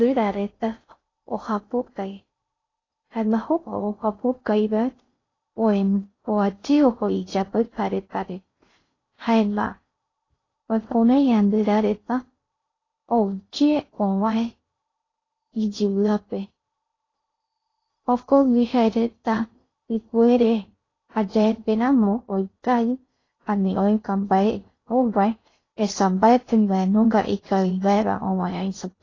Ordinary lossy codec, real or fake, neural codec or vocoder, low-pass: AAC, 32 kbps; fake; codec, 16 kHz in and 24 kHz out, 0.6 kbps, FocalCodec, streaming, 2048 codes; 7.2 kHz